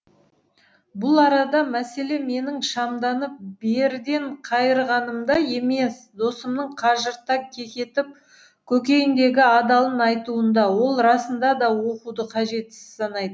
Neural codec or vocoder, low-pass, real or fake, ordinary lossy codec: none; none; real; none